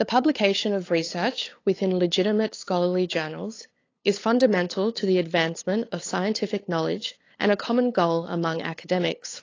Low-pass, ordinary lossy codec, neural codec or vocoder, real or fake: 7.2 kHz; AAC, 32 kbps; codec, 16 kHz, 8 kbps, FunCodec, trained on LibriTTS, 25 frames a second; fake